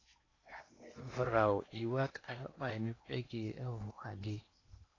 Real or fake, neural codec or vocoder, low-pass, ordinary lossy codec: fake; codec, 16 kHz in and 24 kHz out, 0.8 kbps, FocalCodec, streaming, 65536 codes; 7.2 kHz; AAC, 32 kbps